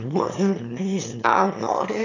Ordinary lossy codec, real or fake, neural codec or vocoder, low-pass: MP3, 64 kbps; fake; autoencoder, 22.05 kHz, a latent of 192 numbers a frame, VITS, trained on one speaker; 7.2 kHz